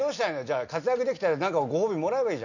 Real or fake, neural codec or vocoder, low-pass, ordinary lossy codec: real; none; 7.2 kHz; none